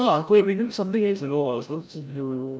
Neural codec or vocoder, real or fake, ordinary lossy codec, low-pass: codec, 16 kHz, 0.5 kbps, FreqCodec, larger model; fake; none; none